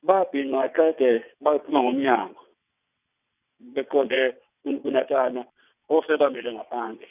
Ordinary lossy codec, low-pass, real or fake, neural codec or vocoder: none; 3.6 kHz; fake; vocoder, 22.05 kHz, 80 mel bands, WaveNeXt